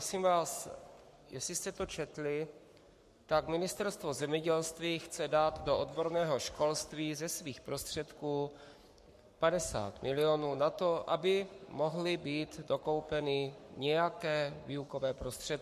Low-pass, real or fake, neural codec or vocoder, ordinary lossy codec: 14.4 kHz; fake; codec, 44.1 kHz, 7.8 kbps, Pupu-Codec; MP3, 64 kbps